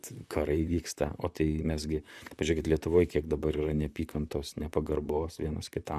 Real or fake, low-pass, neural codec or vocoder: fake; 14.4 kHz; vocoder, 44.1 kHz, 128 mel bands, Pupu-Vocoder